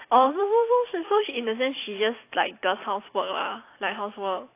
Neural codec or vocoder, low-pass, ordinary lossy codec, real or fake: vocoder, 44.1 kHz, 128 mel bands every 256 samples, BigVGAN v2; 3.6 kHz; AAC, 24 kbps; fake